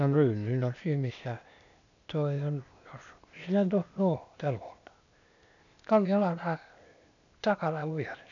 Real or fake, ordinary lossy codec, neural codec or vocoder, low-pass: fake; none; codec, 16 kHz, 0.8 kbps, ZipCodec; 7.2 kHz